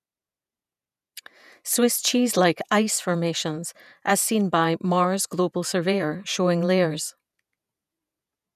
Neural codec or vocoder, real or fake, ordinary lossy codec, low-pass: vocoder, 48 kHz, 128 mel bands, Vocos; fake; none; 14.4 kHz